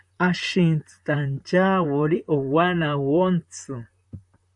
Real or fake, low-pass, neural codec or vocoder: fake; 10.8 kHz; vocoder, 44.1 kHz, 128 mel bands, Pupu-Vocoder